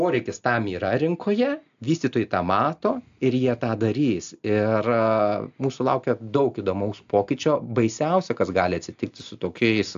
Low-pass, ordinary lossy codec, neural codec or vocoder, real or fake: 7.2 kHz; AAC, 64 kbps; none; real